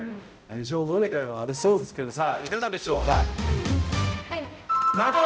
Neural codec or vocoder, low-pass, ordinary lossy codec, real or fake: codec, 16 kHz, 0.5 kbps, X-Codec, HuBERT features, trained on balanced general audio; none; none; fake